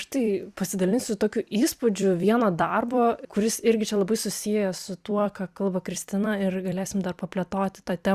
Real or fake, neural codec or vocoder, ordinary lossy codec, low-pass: fake; vocoder, 44.1 kHz, 128 mel bands every 256 samples, BigVGAN v2; Opus, 64 kbps; 14.4 kHz